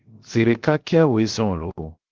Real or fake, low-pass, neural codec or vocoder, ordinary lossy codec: fake; 7.2 kHz; codec, 16 kHz, 0.7 kbps, FocalCodec; Opus, 16 kbps